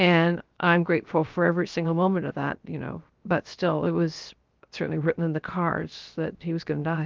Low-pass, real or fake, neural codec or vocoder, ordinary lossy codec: 7.2 kHz; fake; codec, 16 kHz, 0.7 kbps, FocalCodec; Opus, 24 kbps